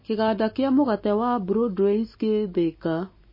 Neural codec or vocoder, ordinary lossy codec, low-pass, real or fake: none; MP3, 24 kbps; 5.4 kHz; real